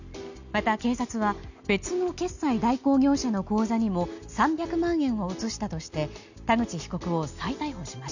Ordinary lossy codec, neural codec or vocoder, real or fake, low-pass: none; none; real; 7.2 kHz